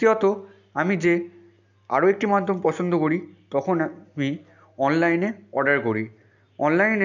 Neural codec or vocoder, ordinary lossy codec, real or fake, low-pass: none; none; real; 7.2 kHz